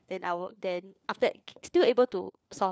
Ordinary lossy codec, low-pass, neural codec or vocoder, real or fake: none; none; codec, 16 kHz, 4 kbps, FunCodec, trained on LibriTTS, 50 frames a second; fake